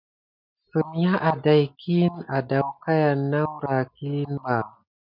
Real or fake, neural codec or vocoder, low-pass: real; none; 5.4 kHz